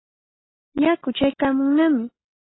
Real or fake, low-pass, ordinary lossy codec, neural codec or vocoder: real; 7.2 kHz; AAC, 16 kbps; none